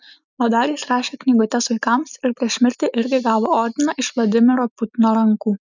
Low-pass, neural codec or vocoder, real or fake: 7.2 kHz; none; real